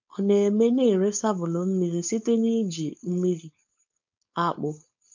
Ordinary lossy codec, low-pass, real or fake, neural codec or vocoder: MP3, 64 kbps; 7.2 kHz; fake; codec, 16 kHz, 4.8 kbps, FACodec